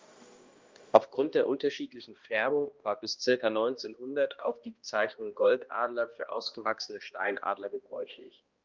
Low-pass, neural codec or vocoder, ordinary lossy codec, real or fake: 7.2 kHz; codec, 16 kHz, 1 kbps, X-Codec, HuBERT features, trained on balanced general audio; Opus, 32 kbps; fake